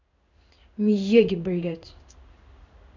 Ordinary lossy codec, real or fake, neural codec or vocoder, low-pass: none; fake; codec, 16 kHz in and 24 kHz out, 1 kbps, XY-Tokenizer; 7.2 kHz